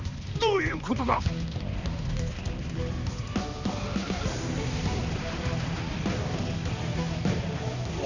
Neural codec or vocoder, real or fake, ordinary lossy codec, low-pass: codec, 16 kHz, 2 kbps, X-Codec, HuBERT features, trained on balanced general audio; fake; none; 7.2 kHz